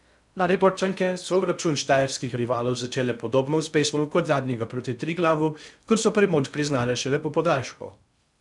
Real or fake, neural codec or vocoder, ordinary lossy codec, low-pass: fake; codec, 16 kHz in and 24 kHz out, 0.6 kbps, FocalCodec, streaming, 2048 codes; none; 10.8 kHz